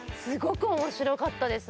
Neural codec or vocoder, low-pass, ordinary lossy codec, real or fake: none; none; none; real